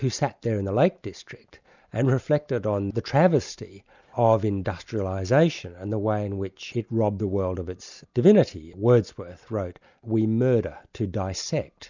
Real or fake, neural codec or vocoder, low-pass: real; none; 7.2 kHz